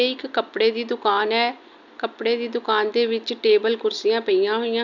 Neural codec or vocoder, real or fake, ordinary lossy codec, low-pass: none; real; none; 7.2 kHz